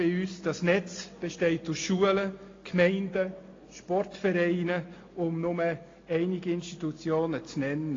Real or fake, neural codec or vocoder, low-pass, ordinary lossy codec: real; none; 7.2 kHz; AAC, 32 kbps